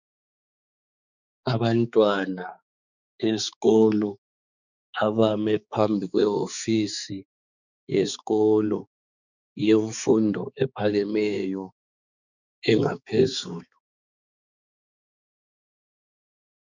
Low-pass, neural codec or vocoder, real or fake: 7.2 kHz; codec, 16 kHz, 4 kbps, X-Codec, HuBERT features, trained on balanced general audio; fake